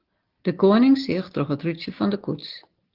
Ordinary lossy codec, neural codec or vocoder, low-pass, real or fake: Opus, 16 kbps; none; 5.4 kHz; real